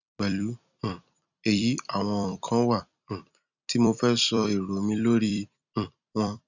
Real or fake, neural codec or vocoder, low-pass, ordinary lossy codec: fake; vocoder, 44.1 kHz, 128 mel bands every 256 samples, BigVGAN v2; 7.2 kHz; none